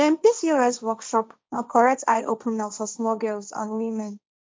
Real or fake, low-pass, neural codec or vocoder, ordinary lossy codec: fake; none; codec, 16 kHz, 1.1 kbps, Voila-Tokenizer; none